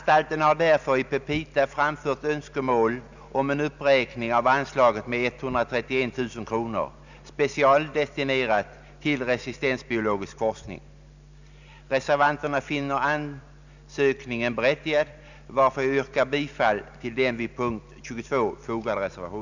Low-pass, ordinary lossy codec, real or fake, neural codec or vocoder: 7.2 kHz; none; real; none